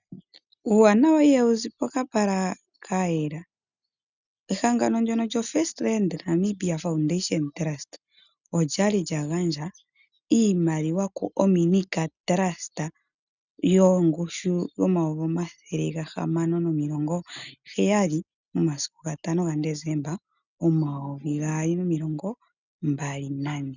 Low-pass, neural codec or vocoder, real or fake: 7.2 kHz; none; real